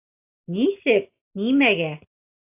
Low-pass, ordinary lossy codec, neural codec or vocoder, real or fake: 3.6 kHz; AAC, 32 kbps; none; real